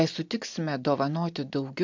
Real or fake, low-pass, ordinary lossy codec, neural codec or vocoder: real; 7.2 kHz; MP3, 64 kbps; none